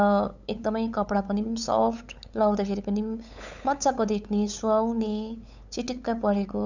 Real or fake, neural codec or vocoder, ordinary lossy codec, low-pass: fake; codec, 16 kHz, 8 kbps, FunCodec, trained on LibriTTS, 25 frames a second; none; 7.2 kHz